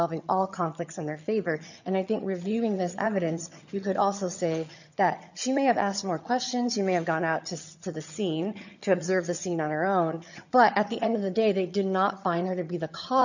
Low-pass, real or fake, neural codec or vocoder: 7.2 kHz; fake; vocoder, 22.05 kHz, 80 mel bands, HiFi-GAN